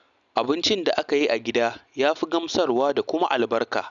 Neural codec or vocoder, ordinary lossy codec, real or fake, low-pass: none; none; real; 7.2 kHz